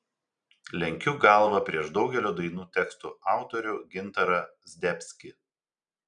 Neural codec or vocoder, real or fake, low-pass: none; real; 9.9 kHz